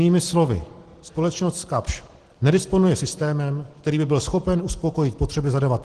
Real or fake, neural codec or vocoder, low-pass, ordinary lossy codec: real; none; 10.8 kHz; Opus, 16 kbps